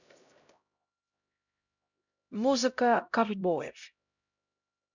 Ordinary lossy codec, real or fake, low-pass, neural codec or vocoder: none; fake; 7.2 kHz; codec, 16 kHz, 0.5 kbps, X-Codec, HuBERT features, trained on LibriSpeech